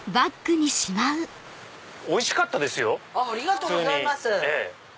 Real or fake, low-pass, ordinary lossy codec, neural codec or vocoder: real; none; none; none